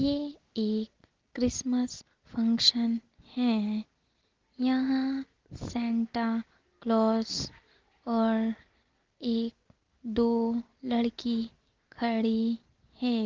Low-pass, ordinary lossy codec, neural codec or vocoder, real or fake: 7.2 kHz; Opus, 16 kbps; none; real